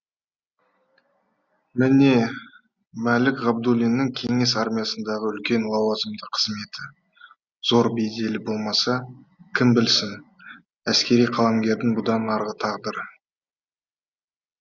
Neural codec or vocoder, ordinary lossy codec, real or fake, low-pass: none; Opus, 64 kbps; real; 7.2 kHz